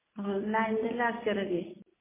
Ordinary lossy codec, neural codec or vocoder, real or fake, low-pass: MP3, 16 kbps; none; real; 3.6 kHz